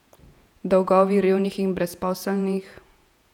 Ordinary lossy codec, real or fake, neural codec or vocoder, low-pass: none; fake; vocoder, 48 kHz, 128 mel bands, Vocos; 19.8 kHz